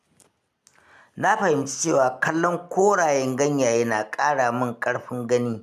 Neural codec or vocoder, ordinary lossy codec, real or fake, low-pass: none; none; real; 14.4 kHz